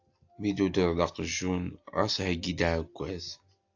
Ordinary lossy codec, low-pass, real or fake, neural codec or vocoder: AAC, 48 kbps; 7.2 kHz; real; none